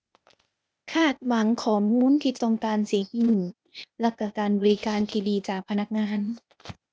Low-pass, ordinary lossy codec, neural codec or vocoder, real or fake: none; none; codec, 16 kHz, 0.8 kbps, ZipCodec; fake